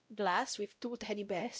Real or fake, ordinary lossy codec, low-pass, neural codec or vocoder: fake; none; none; codec, 16 kHz, 0.5 kbps, X-Codec, WavLM features, trained on Multilingual LibriSpeech